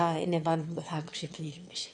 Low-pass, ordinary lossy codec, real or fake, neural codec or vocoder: 9.9 kHz; AAC, 64 kbps; fake; autoencoder, 22.05 kHz, a latent of 192 numbers a frame, VITS, trained on one speaker